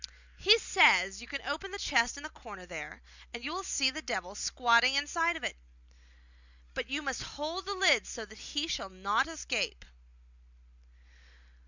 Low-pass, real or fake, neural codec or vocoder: 7.2 kHz; real; none